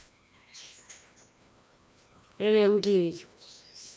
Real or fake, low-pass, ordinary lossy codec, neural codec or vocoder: fake; none; none; codec, 16 kHz, 1 kbps, FreqCodec, larger model